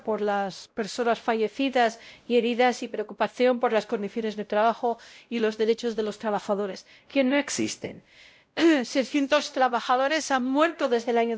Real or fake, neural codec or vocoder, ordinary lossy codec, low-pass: fake; codec, 16 kHz, 0.5 kbps, X-Codec, WavLM features, trained on Multilingual LibriSpeech; none; none